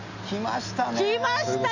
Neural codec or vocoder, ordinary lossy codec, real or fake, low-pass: none; none; real; 7.2 kHz